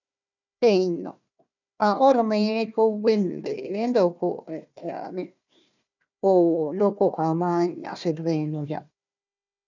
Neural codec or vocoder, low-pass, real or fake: codec, 16 kHz, 1 kbps, FunCodec, trained on Chinese and English, 50 frames a second; 7.2 kHz; fake